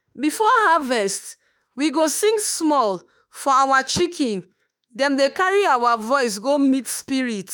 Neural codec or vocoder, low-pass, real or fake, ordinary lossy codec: autoencoder, 48 kHz, 32 numbers a frame, DAC-VAE, trained on Japanese speech; none; fake; none